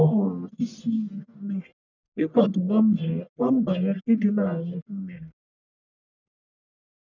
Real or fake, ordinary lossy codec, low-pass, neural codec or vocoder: fake; none; 7.2 kHz; codec, 44.1 kHz, 1.7 kbps, Pupu-Codec